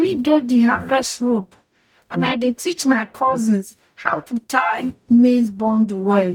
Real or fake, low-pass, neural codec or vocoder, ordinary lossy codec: fake; 19.8 kHz; codec, 44.1 kHz, 0.9 kbps, DAC; none